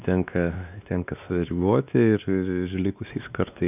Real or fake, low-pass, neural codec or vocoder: fake; 3.6 kHz; codec, 16 kHz, 2 kbps, X-Codec, WavLM features, trained on Multilingual LibriSpeech